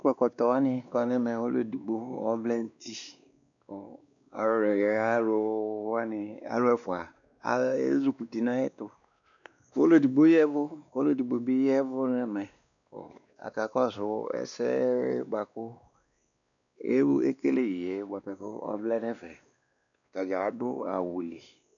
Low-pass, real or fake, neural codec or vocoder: 7.2 kHz; fake; codec, 16 kHz, 2 kbps, X-Codec, WavLM features, trained on Multilingual LibriSpeech